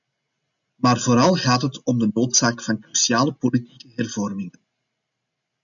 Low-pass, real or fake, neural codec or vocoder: 7.2 kHz; real; none